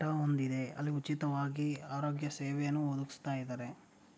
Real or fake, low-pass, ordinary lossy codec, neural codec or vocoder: real; none; none; none